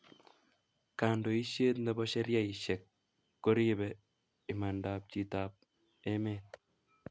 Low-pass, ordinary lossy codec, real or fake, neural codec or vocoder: none; none; real; none